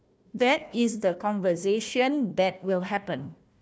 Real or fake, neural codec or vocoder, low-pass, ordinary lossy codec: fake; codec, 16 kHz, 1 kbps, FunCodec, trained on Chinese and English, 50 frames a second; none; none